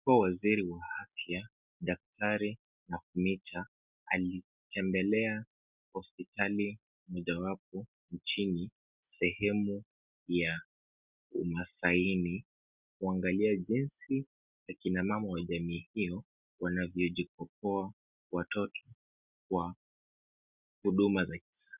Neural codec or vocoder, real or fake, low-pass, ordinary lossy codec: none; real; 3.6 kHz; Opus, 64 kbps